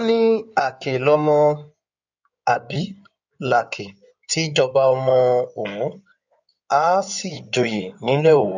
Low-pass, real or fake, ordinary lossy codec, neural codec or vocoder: 7.2 kHz; fake; none; codec, 16 kHz in and 24 kHz out, 2.2 kbps, FireRedTTS-2 codec